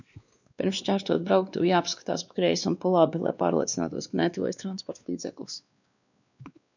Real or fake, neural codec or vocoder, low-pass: fake; codec, 16 kHz, 2 kbps, X-Codec, WavLM features, trained on Multilingual LibriSpeech; 7.2 kHz